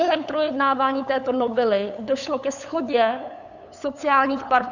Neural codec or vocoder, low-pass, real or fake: codec, 16 kHz, 8 kbps, FunCodec, trained on LibriTTS, 25 frames a second; 7.2 kHz; fake